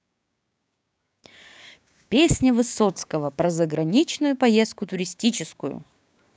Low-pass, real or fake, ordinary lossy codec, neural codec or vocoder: none; fake; none; codec, 16 kHz, 6 kbps, DAC